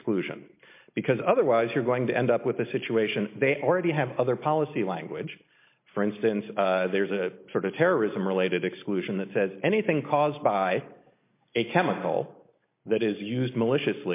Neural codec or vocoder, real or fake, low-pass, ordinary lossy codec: none; real; 3.6 kHz; MP3, 24 kbps